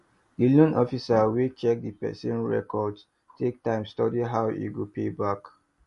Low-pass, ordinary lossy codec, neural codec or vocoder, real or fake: 14.4 kHz; MP3, 48 kbps; none; real